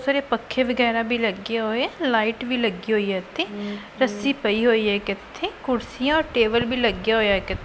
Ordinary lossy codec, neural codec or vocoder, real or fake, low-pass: none; none; real; none